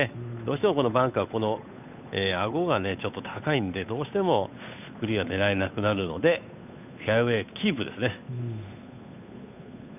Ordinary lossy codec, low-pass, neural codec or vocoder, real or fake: none; 3.6 kHz; codec, 16 kHz, 8 kbps, FunCodec, trained on Chinese and English, 25 frames a second; fake